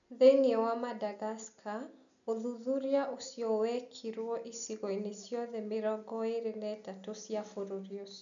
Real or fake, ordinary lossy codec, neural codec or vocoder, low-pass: real; none; none; 7.2 kHz